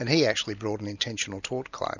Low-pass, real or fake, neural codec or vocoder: 7.2 kHz; real; none